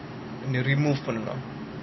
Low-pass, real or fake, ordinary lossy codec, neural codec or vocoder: 7.2 kHz; real; MP3, 24 kbps; none